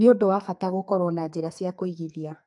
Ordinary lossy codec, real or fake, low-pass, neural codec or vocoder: AAC, 64 kbps; fake; 10.8 kHz; codec, 44.1 kHz, 2.6 kbps, SNAC